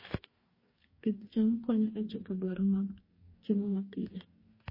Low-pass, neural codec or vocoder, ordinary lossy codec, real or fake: 5.4 kHz; codec, 44.1 kHz, 2.6 kbps, DAC; MP3, 24 kbps; fake